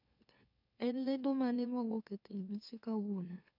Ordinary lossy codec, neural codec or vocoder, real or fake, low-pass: none; autoencoder, 44.1 kHz, a latent of 192 numbers a frame, MeloTTS; fake; 5.4 kHz